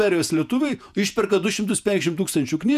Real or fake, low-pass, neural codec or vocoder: fake; 14.4 kHz; vocoder, 48 kHz, 128 mel bands, Vocos